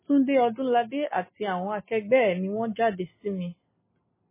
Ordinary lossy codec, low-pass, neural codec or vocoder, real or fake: MP3, 16 kbps; 3.6 kHz; none; real